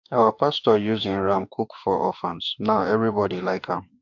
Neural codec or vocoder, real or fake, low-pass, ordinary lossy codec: autoencoder, 48 kHz, 32 numbers a frame, DAC-VAE, trained on Japanese speech; fake; 7.2 kHz; AAC, 48 kbps